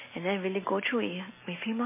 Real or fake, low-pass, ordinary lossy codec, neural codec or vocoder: real; 3.6 kHz; MP3, 16 kbps; none